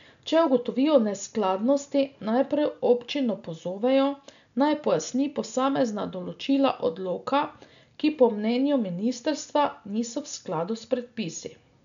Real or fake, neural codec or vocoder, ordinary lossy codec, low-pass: real; none; none; 7.2 kHz